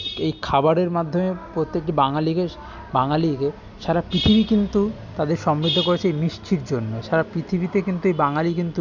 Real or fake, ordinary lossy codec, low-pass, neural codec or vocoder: real; none; 7.2 kHz; none